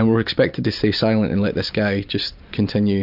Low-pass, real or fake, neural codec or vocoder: 5.4 kHz; real; none